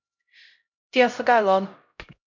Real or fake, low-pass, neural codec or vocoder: fake; 7.2 kHz; codec, 16 kHz, 0.5 kbps, X-Codec, HuBERT features, trained on LibriSpeech